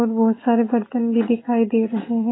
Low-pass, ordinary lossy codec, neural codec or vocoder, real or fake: 7.2 kHz; AAC, 16 kbps; none; real